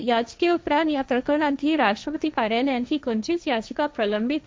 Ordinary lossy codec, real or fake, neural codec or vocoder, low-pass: none; fake; codec, 16 kHz, 1.1 kbps, Voila-Tokenizer; none